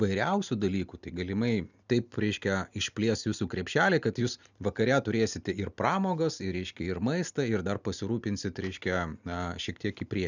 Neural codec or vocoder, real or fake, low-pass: none; real; 7.2 kHz